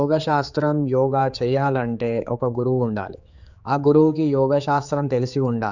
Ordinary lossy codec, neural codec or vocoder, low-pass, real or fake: none; codec, 16 kHz, 4 kbps, X-Codec, HuBERT features, trained on general audio; 7.2 kHz; fake